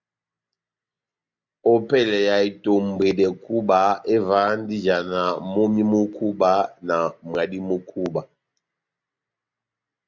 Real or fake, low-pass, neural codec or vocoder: real; 7.2 kHz; none